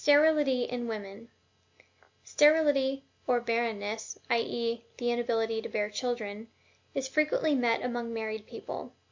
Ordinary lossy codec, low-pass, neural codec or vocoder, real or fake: MP3, 48 kbps; 7.2 kHz; none; real